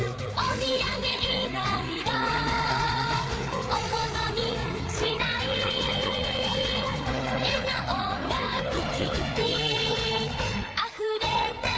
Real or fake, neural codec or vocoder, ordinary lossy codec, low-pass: fake; codec, 16 kHz, 4 kbps, FreqCodec, larger model; none; none